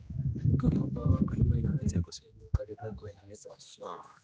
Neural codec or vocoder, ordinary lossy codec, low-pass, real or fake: codec, 16 kHz, 1 kbps, X-Codec, HuBERT features, trained on general audio; none; none; fake